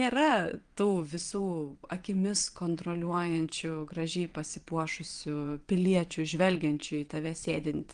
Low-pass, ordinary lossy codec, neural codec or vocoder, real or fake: 9.9 kHz; Opus, 24 kbps; vocoder, 22.05 kHz, 80 mel bands, WaveNeXt; fake